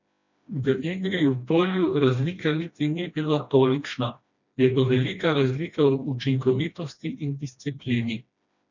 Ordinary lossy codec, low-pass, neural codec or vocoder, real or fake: none; 7.2 kHz; codec, 16 kHz, 1 kbps, FreqCodec, smaller model; fake